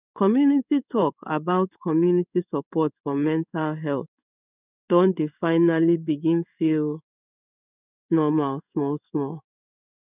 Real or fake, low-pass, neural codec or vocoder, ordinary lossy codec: fake; 3.6 kHz; codec, 16 kHz in and 24 kHz out, 1 kbps, XY-Tokenizer; none